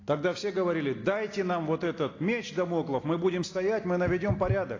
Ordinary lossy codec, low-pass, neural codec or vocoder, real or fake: AAC, 32 kbps; 7.2 kHz; none; real